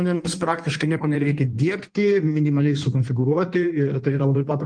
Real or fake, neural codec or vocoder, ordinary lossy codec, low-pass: fake; codec, 16 kHz in and 24 kHz out, 1.1 kbps, FireRedTTS-2 codec; Opus, 24 kbps; 9.9 kHz